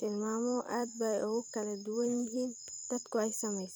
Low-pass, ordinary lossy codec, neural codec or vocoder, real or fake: none; none; vocoder, 44.1 kHz, 128 mel bands every 256 samples, BigVGAN v2; fake